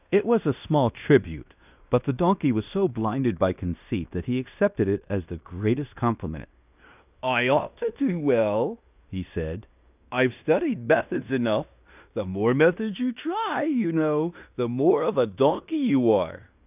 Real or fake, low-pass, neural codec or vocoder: fake; 3.6 kHz; codec, 16 kHz in and 24 kHz out, 0.9 kbps, LongCat-Audio-Codec, fine tuned four codebook decoder